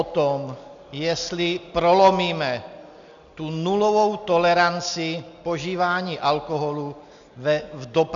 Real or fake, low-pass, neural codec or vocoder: real; 7.2 kHz; none